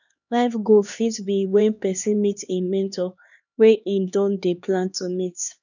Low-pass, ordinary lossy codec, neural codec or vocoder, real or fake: 7.2 kHz; none; codec, 16 kHz, 2 kbps, X-Codec, HuBERT features, trained on LibriSpeech; fake